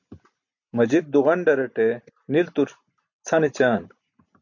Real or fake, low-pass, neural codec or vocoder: real; 7.2 kHz; none